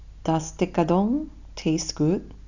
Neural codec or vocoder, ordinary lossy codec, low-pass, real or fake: none; none; 7.2 kHz; real